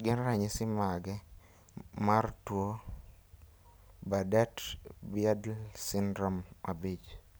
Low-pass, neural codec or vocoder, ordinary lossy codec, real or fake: none; none; none; real